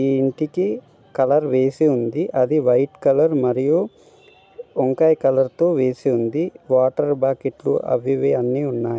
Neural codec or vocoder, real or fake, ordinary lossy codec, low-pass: none; real; none; none